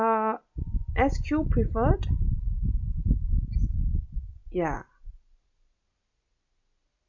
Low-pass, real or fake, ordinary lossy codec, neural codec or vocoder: 7.2 kHz; real; MP3, 64 kbps; none